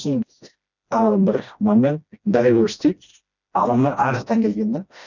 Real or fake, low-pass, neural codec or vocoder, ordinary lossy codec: fake; 7.2 kHz; codec, 16 kHz, 1 kbps, FreqCodec, smaller model; none